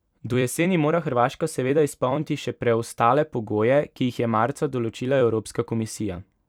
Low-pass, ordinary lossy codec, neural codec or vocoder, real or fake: 19.8 kHz; none; vocoder, 44.1 kHz, 128 mel bands every 256 samples, BigVGAN v2; fake